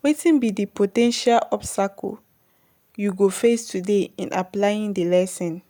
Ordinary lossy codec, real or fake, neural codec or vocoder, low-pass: none; real; none; none